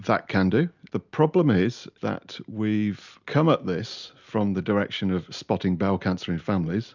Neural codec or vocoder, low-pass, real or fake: none; 7.2 kHz; real